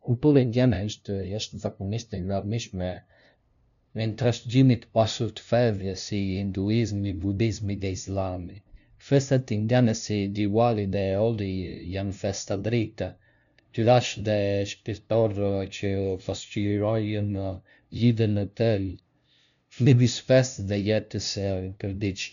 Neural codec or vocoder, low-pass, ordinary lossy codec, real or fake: codec, 16 kHz, 0.5 kbps, FunCodec, trained on LibriTTS, 25 frames a second; 7.2 kHz; none; fake